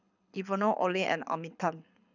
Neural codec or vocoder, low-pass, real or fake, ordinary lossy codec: codec, 24 kHz, 6 kbps, HILCodec; 7.2 kHz; fake; none